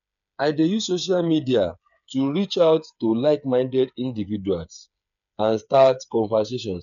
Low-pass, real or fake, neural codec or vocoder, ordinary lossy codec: 7.2 kHz; fake; codec, 16 kHz, 8 kbps, FreqCodec, smaller model; none